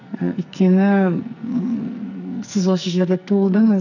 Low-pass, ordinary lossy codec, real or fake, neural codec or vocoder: 7.2 kHz; none; fake; codec, 44.1 kHz, 2.6 kbps, SNAC